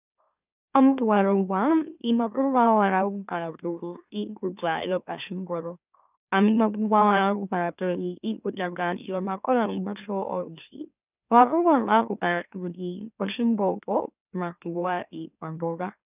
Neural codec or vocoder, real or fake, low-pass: autoencoder, 44.1 kHz, a latent of 192 numbers a frame, MeloTTS; fake; 3.6 kHz